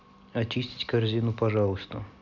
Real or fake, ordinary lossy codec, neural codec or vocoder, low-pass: real; none; none; 7.2 kHz